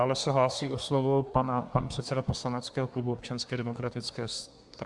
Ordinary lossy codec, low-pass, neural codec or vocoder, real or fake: Opus, 64 kbps; 10.8 kHz; codec, 24 kHz, 1 kbps, SNAC; fake